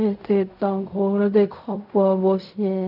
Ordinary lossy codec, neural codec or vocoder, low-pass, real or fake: none; codec, 16 kHz in and 24 kHz out, 0.4 kbps, LongCat-Audio-Codec, fine tuned four codebook decoder; 5.4 kHz; fake